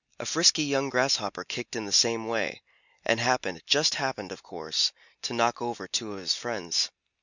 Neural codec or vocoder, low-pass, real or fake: none; 7.2 kHz; real